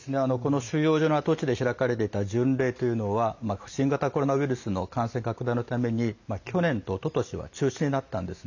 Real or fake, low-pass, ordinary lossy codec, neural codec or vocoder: real; 7.2 kHz; none; none